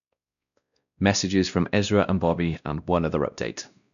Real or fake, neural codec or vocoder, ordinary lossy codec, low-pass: fake; codec, 16 kHz, 1 kbps, X-Codec, WavLM features, trained on Multilingual LibriSpeech; none; 7.2 kHz